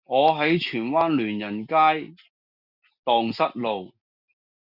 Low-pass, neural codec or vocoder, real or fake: 5.4 kHz; none; real